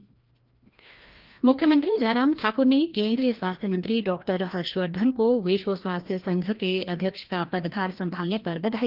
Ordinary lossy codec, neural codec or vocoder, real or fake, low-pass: Opus, 32 kbps; codec, 16 kHz, 1 kbps, FreqCodec, larger model; fake; 5.4 kHz